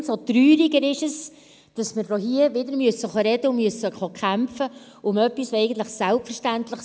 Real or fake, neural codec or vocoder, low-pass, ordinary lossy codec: real; none; none; none